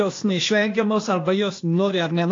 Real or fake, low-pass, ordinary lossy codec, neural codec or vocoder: fake; 7.2 kHz; AAC, 48 kbps; codec, 16 kHz, 0.8 kbps, ZipCodec